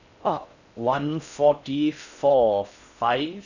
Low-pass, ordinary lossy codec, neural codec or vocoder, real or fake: 7.2 kHz; none; codec, 16 kHz in and 24 kHz out, 0.6 kbps, FocalCodec, streaming, 4096 codes; fake